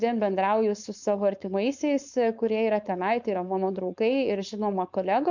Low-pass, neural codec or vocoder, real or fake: 7.2 kHz; codec, 16 kHz, 4.8 kbps, FACodec; fake